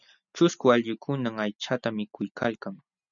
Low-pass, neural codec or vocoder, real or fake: 7.2 kHz; none; real